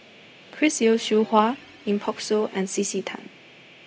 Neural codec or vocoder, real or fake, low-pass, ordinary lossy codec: codec, 16 kHz, 0.4 kbps, LongCat-Audio-Codec; fake; none; none